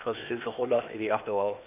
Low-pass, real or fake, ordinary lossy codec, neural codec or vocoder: 3.6 kHz; fake; none; codec, 16 kHz, 0.8 kbps, ZipCodec